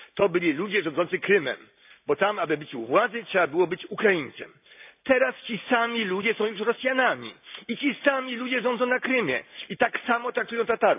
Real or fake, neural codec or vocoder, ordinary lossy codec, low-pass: real; none; AAC, 32 kbps; 3.6 kHz